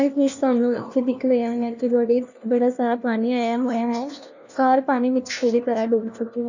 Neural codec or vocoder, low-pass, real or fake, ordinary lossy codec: codec, 16 kHz, 1 kbps, FunCodec, trained on LibriTTS, 50 frames a second; 7.2 kHz; fake; none